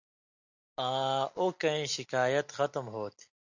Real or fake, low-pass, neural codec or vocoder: real; 7.2 kHz; none